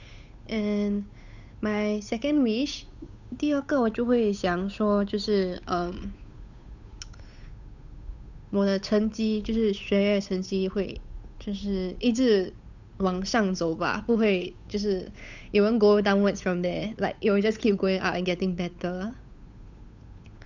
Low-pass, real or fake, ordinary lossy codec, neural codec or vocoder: 7.2 kHz; fake; none; codec, 16 kHz, 8 kbps, FunCodec, trained on Chinese and English, 25 frames a second